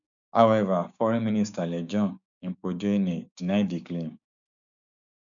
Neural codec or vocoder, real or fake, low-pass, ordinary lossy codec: codec, 16 kHz, 6 kbps, DAC; fake; 7.2 kHz; none